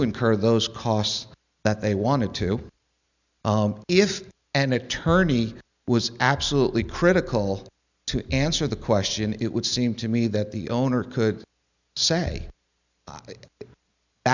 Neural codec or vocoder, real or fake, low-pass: none; real; 7.2 kHz